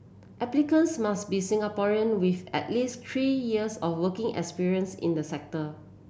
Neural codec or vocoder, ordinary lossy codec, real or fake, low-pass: none; none; real; none